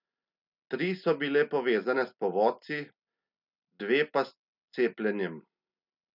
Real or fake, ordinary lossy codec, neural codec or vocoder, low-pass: real; none; none; 5.4 kHz